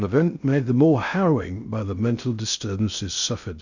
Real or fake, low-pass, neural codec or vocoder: fake; 7.2 kHz; codec, 16 kHz in and 24 kHz out, 0.6 kbps, FocalCodec, streaming, 4096 codes